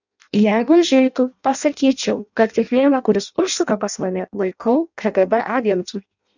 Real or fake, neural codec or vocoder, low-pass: fake; codec, 16 kHz in and 24 kHz out, 0.6 kbps, FireRedTTS-2 codec; 7.2 kHz